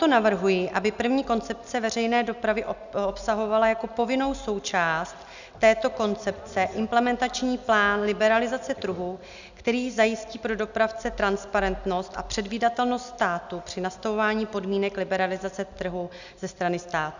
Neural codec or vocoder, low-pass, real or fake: none; 7.2 kHz; real